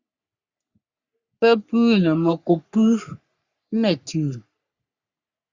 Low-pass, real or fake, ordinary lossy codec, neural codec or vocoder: 7.2 kHz; fake; Opus, 64 kbps; codec, 44.1 kHz, 3.4 kbps, Pupu-Codec